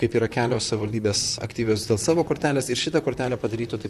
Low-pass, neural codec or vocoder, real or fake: 14.4 kHz; vocoder, 44.1 kHz, 128 mel bands, Pupu-Vocoder; fake